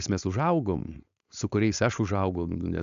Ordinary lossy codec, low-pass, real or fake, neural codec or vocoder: AAC, 64 kbps; 7.2 kHz; fake; codec, 16 kHz, 4.8 kbps, FACodec